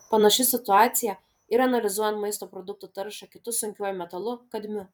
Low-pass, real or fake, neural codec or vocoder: 19.8 kHz; fake; vocoder, 44.1 kHz, 128 mel bands every 256 samples, BigVGAN v2